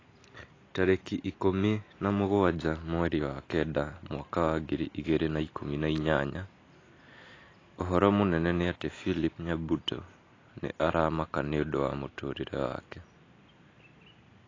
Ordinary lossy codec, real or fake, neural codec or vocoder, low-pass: AAC, 32 kbps; real; none; 7.2 kHz